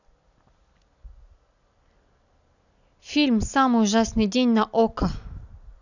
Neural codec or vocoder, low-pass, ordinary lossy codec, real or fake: none; 7.2 kHz; none; real